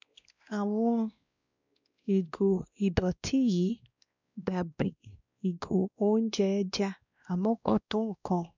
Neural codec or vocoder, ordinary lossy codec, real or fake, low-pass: codec, 16 kHz, 1 kbps, X-Codec, WavLM features, trained on Multilingual LibriSpeech; none; fake; 7.2 kHz